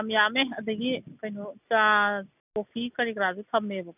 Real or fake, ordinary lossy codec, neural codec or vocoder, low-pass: real; none; none; 3.6 kHz